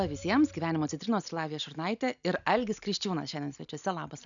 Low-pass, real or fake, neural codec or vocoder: 7.2 kHz; real; none